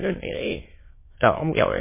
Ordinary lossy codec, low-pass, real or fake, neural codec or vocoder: MP3, 16 kbps; 3.6 kHz; fake; autoencoder, 22.05 kHz, a latent of 192 numbers a frame, VITS, trained on many speakers